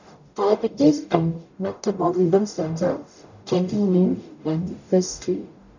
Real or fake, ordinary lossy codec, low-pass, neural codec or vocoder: fake; none; 7.2 kHz; codec, 44.1 kHz, 0.9 kbps, DAC